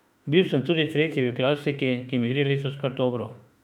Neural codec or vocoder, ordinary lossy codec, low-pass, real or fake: autoencoder, 48 kHz, 32 numbers a frame, DAC-VAE, trained on Japanese speech; none; 19.8 kHz; fake